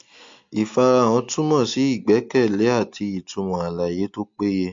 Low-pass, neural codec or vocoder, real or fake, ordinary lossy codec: 7.2 kHz; none; real; MP3, 48 kbps